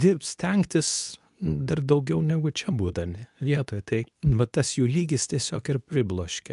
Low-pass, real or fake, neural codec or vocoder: 10.8 kHz; fake; codec, 24 kHz, 0.9 kbps, WavTokenizer, medium speech release version 2